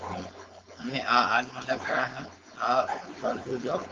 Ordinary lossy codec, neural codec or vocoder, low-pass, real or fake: Opus, 24 kbps; codec, 16 kHz, 4.8 kbps, FACodec; 7.2 kHz; fake